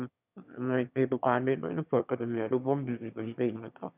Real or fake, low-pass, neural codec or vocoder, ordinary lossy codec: fake; 3.6 kHz; autoencoder, 22.05 kHz, a latent of 192 numbers a frame, VITS, trained on one speaker; none